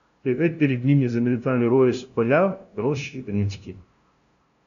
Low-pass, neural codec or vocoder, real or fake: 7.2 kHz; codec, 16 kHz, 0.5 kbps, FunCodec, trained on LibriTTS, 25 frames a second; fake